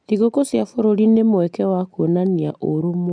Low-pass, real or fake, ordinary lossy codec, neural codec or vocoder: 9.9 kHz; real; MP3, 96 kbps; none